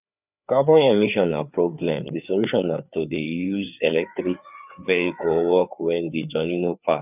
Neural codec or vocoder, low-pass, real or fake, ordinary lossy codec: codec, 16 kHz, 4 kbps, FreqCodec, larger model; 3.6 kHz; fake; none